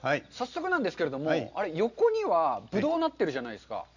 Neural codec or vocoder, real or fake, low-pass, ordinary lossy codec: none; real; 7.2 kHz; none